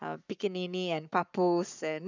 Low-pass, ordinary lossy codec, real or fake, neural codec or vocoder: 7.2 kHz; none; fake; codec, 44.1 kHz, 7.8 kbps, Pupu-Codec